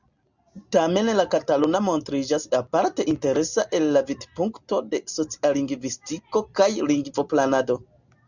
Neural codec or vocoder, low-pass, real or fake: none; 7.2 kHz; real